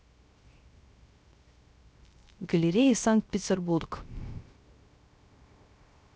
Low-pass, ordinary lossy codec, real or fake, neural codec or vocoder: none; none; fake; codec, 16 kHz, 0.3 kbps, FocalCodec